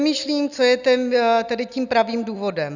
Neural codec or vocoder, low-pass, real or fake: none; 7.2 kHz; real